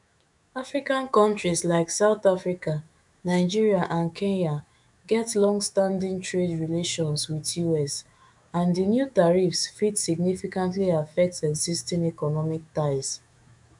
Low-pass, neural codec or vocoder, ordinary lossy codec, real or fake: 10.8 kHz; autoencoder, 48 kHz, 128 numbers a frame, DAC-VAE, trained on Japanese speech; none; fake